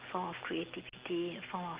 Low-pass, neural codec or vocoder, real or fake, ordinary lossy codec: 3.6 kHz; none; real; Opus, 16 kbps